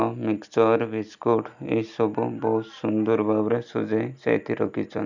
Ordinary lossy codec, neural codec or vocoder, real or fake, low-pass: none; none; real; 7.2 kHz